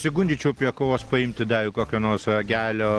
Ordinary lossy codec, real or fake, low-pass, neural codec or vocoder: Opus, 16 kbps; real; 10.8 kHz; none